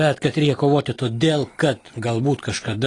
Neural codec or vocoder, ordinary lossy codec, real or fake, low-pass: none; AAC, 32 kbps; real; 10.8 kHz